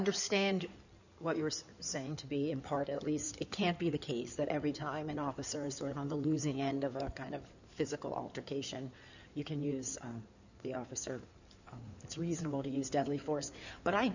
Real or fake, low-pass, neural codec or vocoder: fake; 7.2 kHz; codec, 16 kHz in and 24 kHz out, 2.2 kbps, FireRedTTS-2 codec